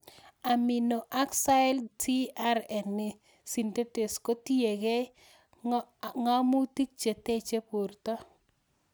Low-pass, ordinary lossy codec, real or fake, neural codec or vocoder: none; none; real; none